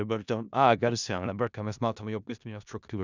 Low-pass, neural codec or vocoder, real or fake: 7.2 kHz; codec, 16 kHz in and 24 kHz out, 0.4 kbps, LongCat-Audio-Codec, four codebook decoder; fake